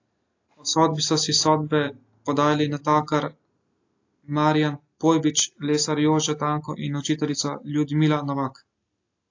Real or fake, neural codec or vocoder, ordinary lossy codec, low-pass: real; none; AAC, 48 kbps; 7.2 kHz